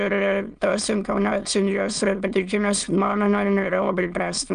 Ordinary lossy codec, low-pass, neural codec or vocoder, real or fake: Opus, 32 kbps; 9.9 kHz; autoencoder, 22.05 kHz, a latent of 192 numbers a frame, VITS, trained on many speakers; fake